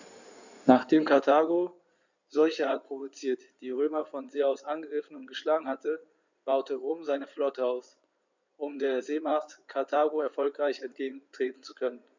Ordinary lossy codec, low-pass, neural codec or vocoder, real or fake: none; 7.2 kHz; codec, 16 kHz in and 24 kHz out, 2.2 kbps, FireRedTTS-2 codec; fake